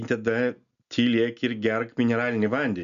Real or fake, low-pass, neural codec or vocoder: real; 7.2 kHz; none